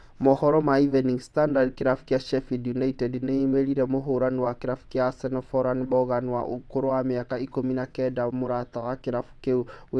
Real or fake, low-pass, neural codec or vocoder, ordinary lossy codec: fake; none; vocoder, 22.05 kHz, 80 mel bands, WaveNeXt; none